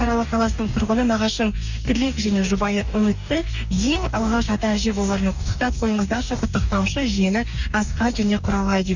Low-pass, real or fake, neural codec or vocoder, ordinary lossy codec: 7.2 kHz; fake; codec, 44.1 kHz, 2.6 kbps, DAC; none